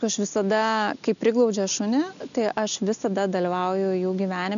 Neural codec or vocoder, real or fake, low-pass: none; real; 7.2 kHz